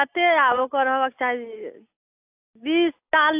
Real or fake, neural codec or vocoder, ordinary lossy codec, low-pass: real; none; AAC, 32 kbps; 3.6 kHz